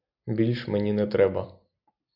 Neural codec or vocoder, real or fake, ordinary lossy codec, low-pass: none; real; AAC, 48 kbps; 5.4 kHz